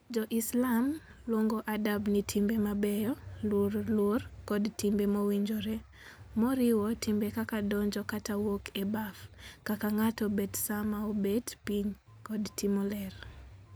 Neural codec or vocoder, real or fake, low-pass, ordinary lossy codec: none; real; none; none